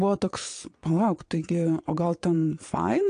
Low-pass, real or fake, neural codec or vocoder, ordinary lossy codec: 9.9 kHz; fake; vocoder, 22.05 kHz, 80 mel bands, WaveNeXt; AAC, 48 kbps